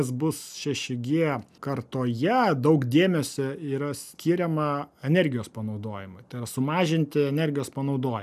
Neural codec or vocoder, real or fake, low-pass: none; real; 14.4 kHz